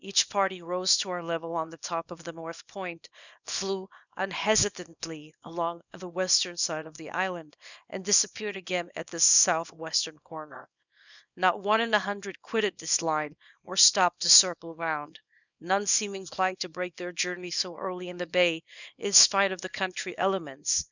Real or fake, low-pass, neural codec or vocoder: fake; 7.2 kHz; codec, 24 kHz, 0.9 kbps, WavTokenizer, small release